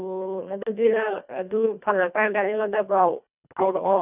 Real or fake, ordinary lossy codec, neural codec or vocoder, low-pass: fake; none; codec, 24 kHz, 1.5 kbps, HILCodec; 3.6 kHz